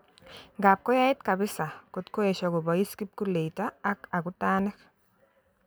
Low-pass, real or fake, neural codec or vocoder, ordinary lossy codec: none; real; none; none